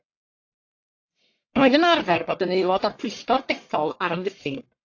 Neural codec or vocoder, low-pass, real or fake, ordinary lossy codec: codec, 44.1 kHz, 1.7 kbps, Pupu-Codec; 7.2 kHz; fake; AAC, 48 kbps